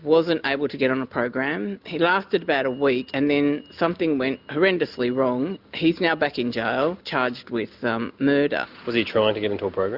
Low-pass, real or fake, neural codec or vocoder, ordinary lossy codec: 5.4 kHz; real; none; Opus, 64 kbps